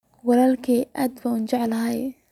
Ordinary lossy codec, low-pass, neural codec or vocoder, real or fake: none; 19.8 kHz; none; real